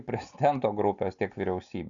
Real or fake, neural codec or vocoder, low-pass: real; none; 7.2 kHz